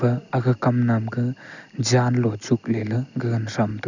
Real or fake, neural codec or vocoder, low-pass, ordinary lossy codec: real; none; 7.2 kHz; none